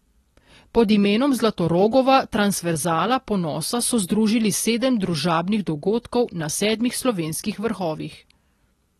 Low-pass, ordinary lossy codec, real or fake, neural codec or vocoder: 19.8 kHz; AAC, 32 kbps; real; none